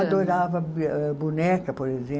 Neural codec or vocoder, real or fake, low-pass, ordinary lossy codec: none; real; none; none